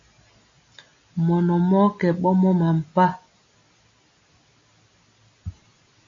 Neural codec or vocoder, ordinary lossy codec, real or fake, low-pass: none; AAC, 48 kbps; real; 7.2 kHz